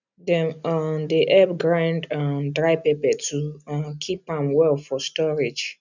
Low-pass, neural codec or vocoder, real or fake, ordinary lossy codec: 7.2 kHz; none; real; none